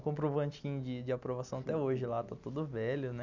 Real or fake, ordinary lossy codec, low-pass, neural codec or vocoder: real; none; 7.2 kHz; none